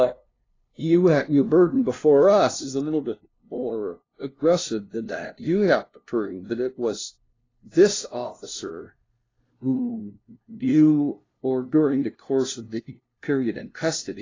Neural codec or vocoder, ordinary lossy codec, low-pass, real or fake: codec, 16 kHz, 0.5 kbps, FunCodec, trained on LibriTTS, 25 frames a second; AAC, 32 kbps; 7.2 kHz; fake